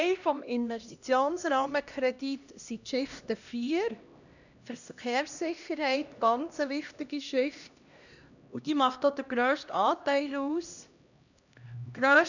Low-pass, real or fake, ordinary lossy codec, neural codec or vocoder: 7.2 kHz; fake; none; codec, 16 kHz, 1 kbps, X-Codec, HuBERT features, trained on LibriSpeech